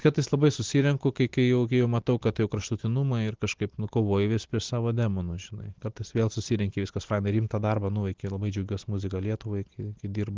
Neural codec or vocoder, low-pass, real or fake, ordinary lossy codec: none; 7.2 kHz; real; Opus, 16 kbps